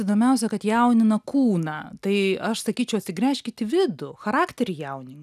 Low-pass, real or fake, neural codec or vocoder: 14.4 kHz; real; none